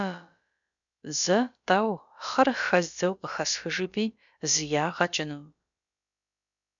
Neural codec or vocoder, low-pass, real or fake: codec, 16 kHz, about 1 kbps, DyCAST, with the encoder's durations; 7.2 kHz; fake